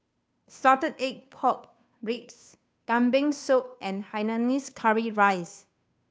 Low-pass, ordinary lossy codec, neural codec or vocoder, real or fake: none; none; codec, 16 kHz, 2 kbps, FunCodec, trained on Chinese and English, 25 frames a second; fake